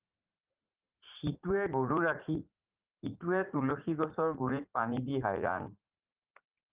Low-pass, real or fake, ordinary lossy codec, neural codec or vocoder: 3.6 kHz; fake; Opus, 32 kbps; vocoder, 44.1 kHz, 80 mel bands, Vocos